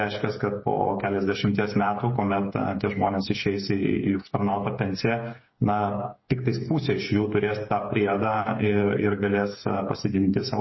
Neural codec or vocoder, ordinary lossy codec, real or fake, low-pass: codec, 16 kHz, 8 kbps, FreqCodec, smaller model; MP3, 24 kbps; fake; 7.2 kHz